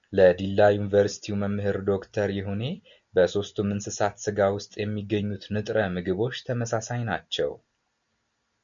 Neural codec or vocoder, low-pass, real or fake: none; 7.2 kHz; real